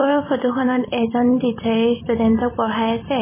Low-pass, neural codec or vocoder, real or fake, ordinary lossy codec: 3.6 kHz; vocoder, 44.1 kHz, 128 mel bands every 256 samples, BigVGAN v2; fake; MP3, 16 kbps